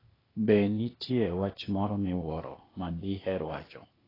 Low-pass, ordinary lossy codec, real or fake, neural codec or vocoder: 5.4 kHz; AAC, 24 kbps; fake; codec, 16 kHz, 0.8 kbps, ZipCodec